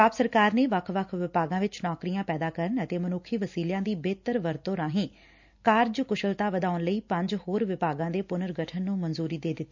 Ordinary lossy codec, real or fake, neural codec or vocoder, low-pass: MP3, 64 kbps; real; none; 7.2 kHz